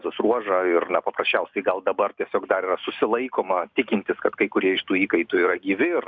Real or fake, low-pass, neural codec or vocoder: real; 7.2 kHz; none